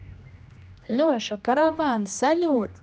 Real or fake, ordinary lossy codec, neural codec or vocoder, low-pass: fake; none; codec, 16 kHz, 1 kbps, X-Codec, HuBERT features, trained on general audio; none